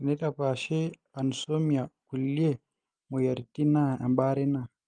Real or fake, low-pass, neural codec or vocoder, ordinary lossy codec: real; 9.9 kHz; none; Opus, 24 kbps